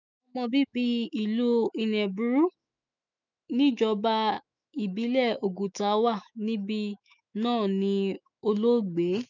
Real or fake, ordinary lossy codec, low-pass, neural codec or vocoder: fake; none; 7.2 kHz; autoencoder, 48 kHz, 128 numbers a frame, DAC-VAE, trained on Japanese speech